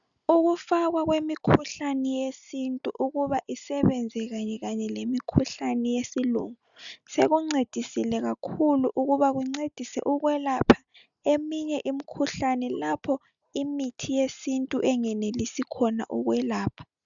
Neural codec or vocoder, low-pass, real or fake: none; 7.2 kHz; real